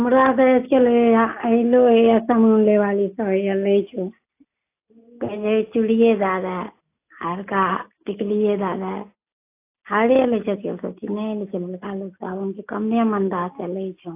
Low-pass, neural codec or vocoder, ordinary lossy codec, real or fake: 3.6 kHz; none; AAC, 24 kbps; real